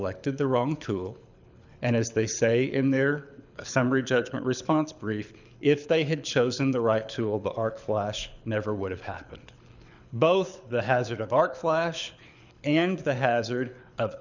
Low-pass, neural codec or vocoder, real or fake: 7.2 kHz; codec, 24 kHz, 6 kbps, HILCodec; fake